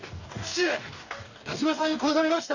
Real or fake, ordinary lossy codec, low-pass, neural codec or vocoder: fake; none; 7.2 kHz; codec, 44.1 kHz, 2.6 kbps, DAC